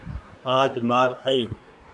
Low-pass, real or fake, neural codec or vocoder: 10.8 kHz; fake; codec, 24 kHz, 1 kbps, SNAC